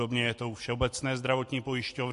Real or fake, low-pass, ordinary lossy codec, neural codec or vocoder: real; 10.8 kHz; MP3, 48 kbps; none